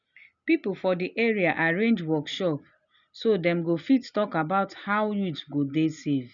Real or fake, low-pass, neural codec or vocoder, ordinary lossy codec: real; 7.2 kHz; none; none